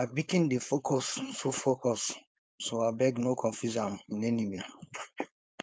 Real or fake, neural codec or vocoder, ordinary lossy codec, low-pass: fake; codec, 16 kHz, 4.8 kbps, FACodec; none; none